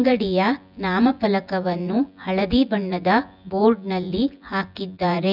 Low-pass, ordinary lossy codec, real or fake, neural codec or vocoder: 5.4 kHz; none; fake; vocoder, 24 kHz, 100 mel bands, Vocos